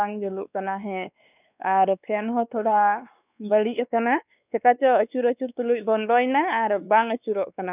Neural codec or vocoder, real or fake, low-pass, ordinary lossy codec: codec, 16 kHz, 2 kbps, X-Codec, WavLM features, trained on Multilingual LibriSpeech; fake; 3.6 kHz; none